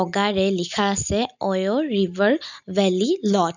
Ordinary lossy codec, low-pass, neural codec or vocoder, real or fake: none; 7.2 kHz; none; real